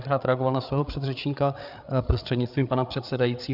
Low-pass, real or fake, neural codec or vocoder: 5.4 kHz; fake; codec, 16 kHz, 8 kbps, FreqCodec, larger model